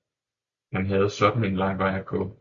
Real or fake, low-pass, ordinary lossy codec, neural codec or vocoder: real; 7.2 kHz; Opus, 64 kbps; none